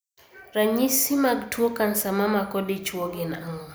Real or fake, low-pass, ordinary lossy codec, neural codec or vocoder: real; none; none; none